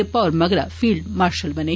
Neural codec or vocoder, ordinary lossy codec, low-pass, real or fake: none; none; none; real